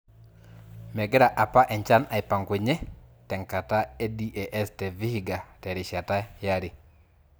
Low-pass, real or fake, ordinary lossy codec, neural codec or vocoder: none; real; none; none